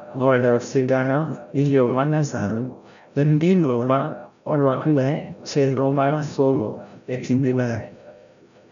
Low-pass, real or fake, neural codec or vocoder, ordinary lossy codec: 7.2 kHz; fake; codec, 16 kHz, 0.5 kbps, FreqCodec, larger model; none